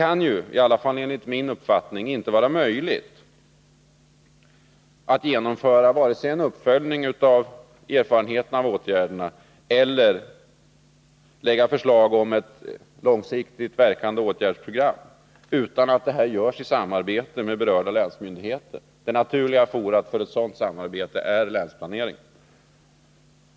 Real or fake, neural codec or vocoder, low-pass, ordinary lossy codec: real; none; none; none